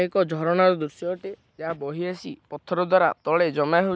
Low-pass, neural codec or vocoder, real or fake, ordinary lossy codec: none; none; real; none